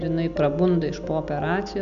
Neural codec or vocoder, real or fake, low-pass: none; real; 7.2 kHz